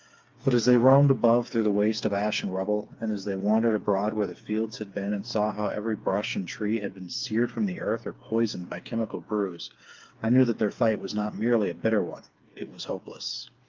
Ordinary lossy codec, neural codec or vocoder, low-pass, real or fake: Opus, 32 kbps; codec, 16 kHz, 4 kbps, FreqCodec, smaller model; 7.2 kHz; fake